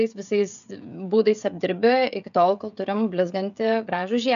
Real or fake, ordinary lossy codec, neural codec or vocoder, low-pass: fake; MP3, 96 kbps; codec, 16 kHz, 16 kbps, FreqCodec, smaller model; 7.2 kHz